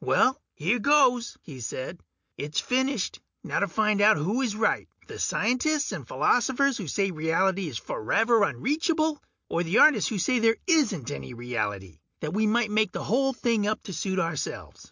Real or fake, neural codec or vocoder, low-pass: real; none; 7.2 kHz